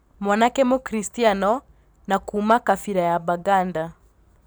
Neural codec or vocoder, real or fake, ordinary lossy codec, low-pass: vocoder, 44.1 kHz, 128 mel bands every 256 samples, BigVGAN v2; fake; none; none